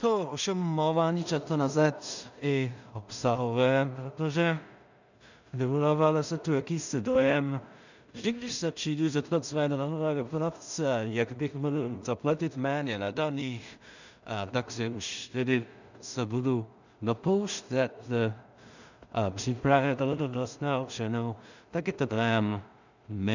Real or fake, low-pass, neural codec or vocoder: fake; 7.2 kHz; codec, 16 kHz in and 24 kHz out, 0.4 kbps, LongCat-Audio-Codec, two codebook decoder